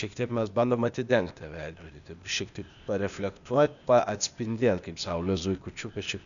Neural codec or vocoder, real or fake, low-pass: codec, 16 kHz, 0.8 kbps, ZipCodec; fake; 7.2 kHz